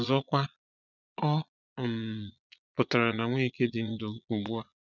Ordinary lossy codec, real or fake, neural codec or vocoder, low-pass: none; fake; vocoder, 22.05 kHz, 80 mel bands, Vocos; 7.2 kHz